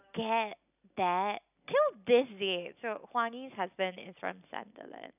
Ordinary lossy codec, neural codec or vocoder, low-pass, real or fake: none; none; 3.6 kHz; real